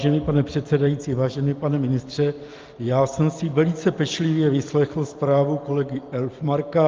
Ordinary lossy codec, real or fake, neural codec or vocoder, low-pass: Opus, 24 kbps; real; none; 7.2 kHz